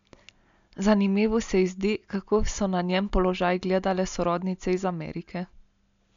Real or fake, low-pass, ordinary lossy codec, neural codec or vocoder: real; 7.2 kHz; MP3, 64 kbps; none